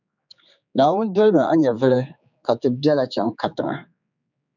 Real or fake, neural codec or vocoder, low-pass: fake; codec, 16 kHz, 4 kbps, X-Codec, HuBERT features, trained on general audio; 7.2 kHz